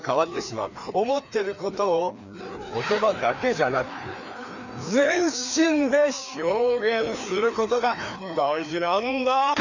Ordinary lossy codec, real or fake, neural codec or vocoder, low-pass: none; fake; codec, 16 kHz, 2 kbps, FreqCodec, larger model; 7.2 kHz